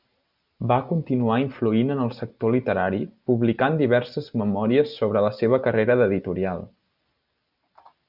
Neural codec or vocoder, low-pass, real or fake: none; 5.4 kHz; real